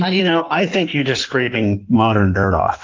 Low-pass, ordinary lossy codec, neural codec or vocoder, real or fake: 7.2 kHz; Opus, 24 kbps; codec, 16 kHz in and 24 kHz out, 1.1 kbps, FireRedTTS-2 codec; fake